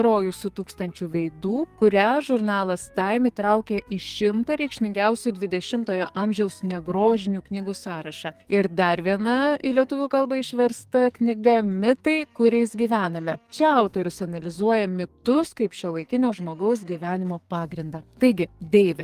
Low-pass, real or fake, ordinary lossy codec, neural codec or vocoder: 14.4 kHz; fake; Opus, 32 kbps; codec, 44.1 kHz, 2.6 kbps, SNAC